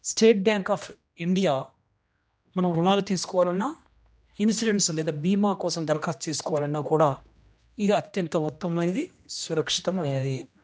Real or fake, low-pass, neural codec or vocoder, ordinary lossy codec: fake; none; codec, 16 kHz, 1 kbps, X-Codec, HuBERT features, trained on general audio; none